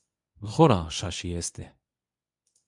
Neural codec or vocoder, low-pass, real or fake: codec, 24 kHz, 0.9 kbps, WavTokenizer, medium speech release version 1; 10.8 kHz; fake